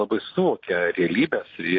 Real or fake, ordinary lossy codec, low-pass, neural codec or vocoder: real; AAC, 32 kbps; 7.2 kHz; none